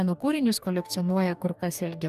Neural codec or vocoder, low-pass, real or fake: codec, 44.1 kHz, 2.6 kbps, DAC; 14.4 kHz; fake